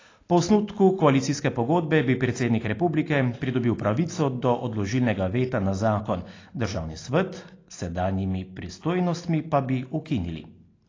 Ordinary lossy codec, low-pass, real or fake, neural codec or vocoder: AAC, 32 kbps; 7.2 kHz; real; none